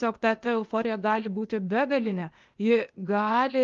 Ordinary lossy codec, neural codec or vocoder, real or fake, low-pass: Opus, 32 kbps; codec, 16 kHz, 0.8 kbps, ZipCodec; fake; 7.2 kHz